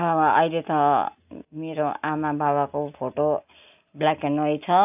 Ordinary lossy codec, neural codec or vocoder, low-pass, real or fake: AAC, 32 kbps; none; 3.6 kHz; real